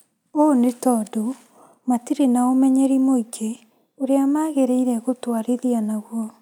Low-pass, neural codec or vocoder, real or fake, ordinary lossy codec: 19.8 kHz; none; real; none